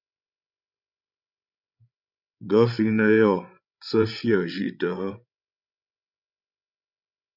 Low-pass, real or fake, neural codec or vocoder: 5.4 kHz; fake; codec, 16 kHz, 16 kbps, FreqCodec, larger model